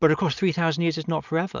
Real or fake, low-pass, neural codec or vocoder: real; 7.2 kHz; none